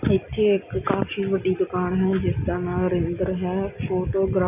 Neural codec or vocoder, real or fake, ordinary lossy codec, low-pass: none; real; none; 3.6 kHz